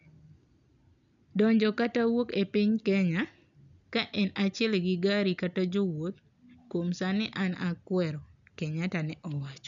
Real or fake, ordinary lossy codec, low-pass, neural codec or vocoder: real; none; 7.2 kHz; none